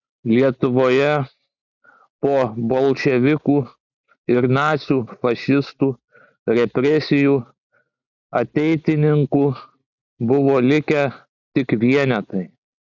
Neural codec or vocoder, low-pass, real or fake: none; 7.2 kHz; real